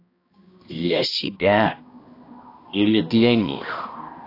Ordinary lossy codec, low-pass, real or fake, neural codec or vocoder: AAC, 32 kbps; 5.4 kHz; fake; codec, 16 kHz, 1 kbps, X-Codec, HuBERT features, trained on balanced general audio